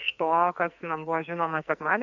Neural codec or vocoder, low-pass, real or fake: codec, 44.1 kHz, 2.6 kbps, SNAC; 7.2 kHz; fake